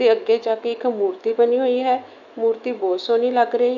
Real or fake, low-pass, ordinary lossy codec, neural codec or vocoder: fake; 7.2 kHz; none; autoencoder, 48 kHz, 128 numbers a frame, DAC-VAE, trained on Japanese speech